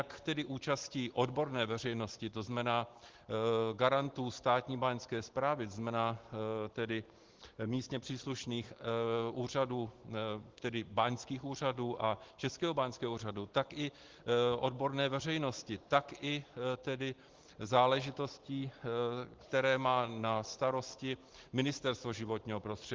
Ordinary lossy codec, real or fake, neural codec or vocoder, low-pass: Opus, 16 kbps; real; none; 7.2 kHz